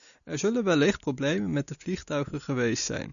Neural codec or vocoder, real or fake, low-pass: none; real; 7.2 kHz